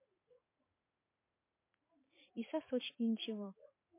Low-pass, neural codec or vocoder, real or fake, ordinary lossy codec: 3.6 kHz; vocoder, 44.1 kHz, 80 mel bands, Vocos; fake; MP3, 24 kbps